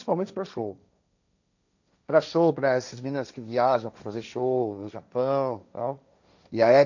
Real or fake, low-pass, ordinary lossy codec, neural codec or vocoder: fake; 7.2 kHz; none; codec, 16 kHz, 1.1 kbps, Voila-Tokenizer